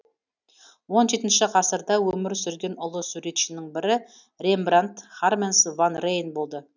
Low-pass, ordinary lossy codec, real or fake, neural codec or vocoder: 7.2 kHz; none; real; none